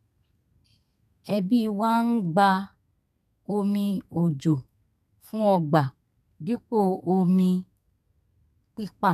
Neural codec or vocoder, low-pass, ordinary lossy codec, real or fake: codec, 32 kHz, 1.9 kbps, SNAC; 14.4 kHz; none; fake